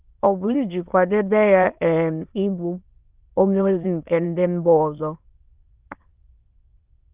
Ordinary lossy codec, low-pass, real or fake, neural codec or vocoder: Opus, 32 kbps; 3.6 kHz; fake; autoencoder, 22.05 kHz, a latent of 192 numbers a frame, VITS, trained on many speakers